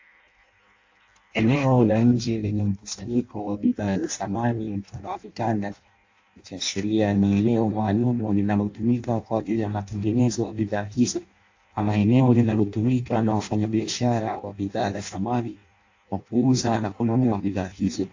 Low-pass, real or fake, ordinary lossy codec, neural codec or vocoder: 7.2 kHz; fake; AAC, 48 kbps; codec, 16 kHz in and 24 kHz out, 0.6 kbps, FireRedTTS-2 codec